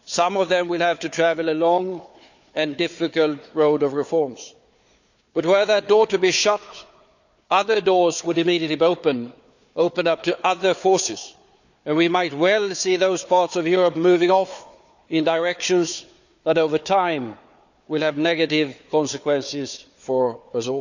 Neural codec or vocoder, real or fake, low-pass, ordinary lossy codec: codec, 16 kHz, 4 kbps, FunCodec, trained on Chinese and English, 50 frames a second; fake; 7.2 kHz; none